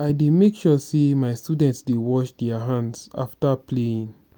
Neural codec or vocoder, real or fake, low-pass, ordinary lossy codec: none; real; none; none